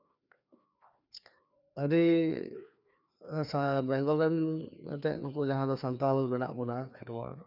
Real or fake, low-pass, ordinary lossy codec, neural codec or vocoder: fake; 5.4 kHz; none; codec, 16 kHz, 2 kbps, FreqCodec, larger model